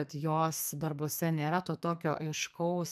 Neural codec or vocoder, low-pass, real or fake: codec, 44.1 kHz, 2.6 kbps, SNAC; 14.4 kHz; fake